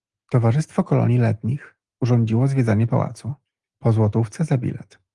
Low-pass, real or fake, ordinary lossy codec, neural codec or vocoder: 10.8 kHz; real; Opus, 24 kbps; none